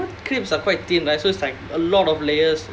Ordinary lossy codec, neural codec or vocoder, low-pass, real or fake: none; none; none; real